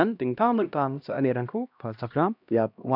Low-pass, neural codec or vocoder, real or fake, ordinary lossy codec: 5.4 kHz; codec, 16 kHz, 1 kbps, X-Codec, HuBERT features, trained on LibriSpeech; fake; none